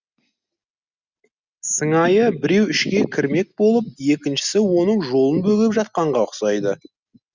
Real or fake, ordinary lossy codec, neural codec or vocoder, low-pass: real; Opus, 64 kbps; none; 7.2 kHz